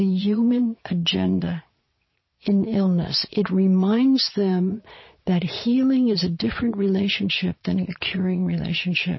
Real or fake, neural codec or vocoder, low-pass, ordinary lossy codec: fake; vocoder, 22.05 kHz, 80 mel bands, Vocos; 7.2 kHz; MP3, 24 kbps